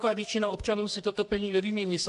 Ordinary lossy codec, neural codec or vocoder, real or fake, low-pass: AAC, 64 kbps; codec, 24 kHz, 0.9 kbps, WavTokenizer, medium music audio release; fake; 10.8 kHz